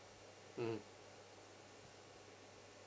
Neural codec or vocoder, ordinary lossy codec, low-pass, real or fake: none; none; none; real